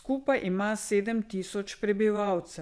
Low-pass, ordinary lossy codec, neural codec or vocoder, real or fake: none; none; vocoder, 22.05 kHz, 80 mel bands, WaveNeXt; fake